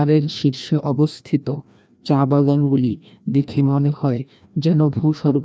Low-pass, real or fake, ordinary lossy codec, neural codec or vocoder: none; fake; none; codec, 16 kHz, 1 kbps, FreqCodec, larger model